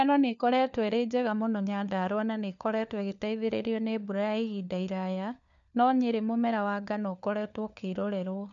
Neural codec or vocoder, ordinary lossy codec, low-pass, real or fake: codec, 16 kHz, 4 kbps, FunCodec, trained on LibriTTS, 50 frames a second; none; 7.2 kHz; fake